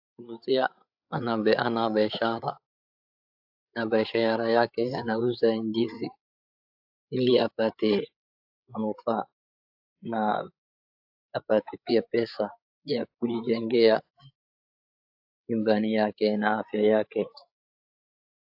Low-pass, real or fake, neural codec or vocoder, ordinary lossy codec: 5.4 kHz; fake; codec, 16 kHz, 8 kbps, FreqCodec, larger model; AAC, 48 kbps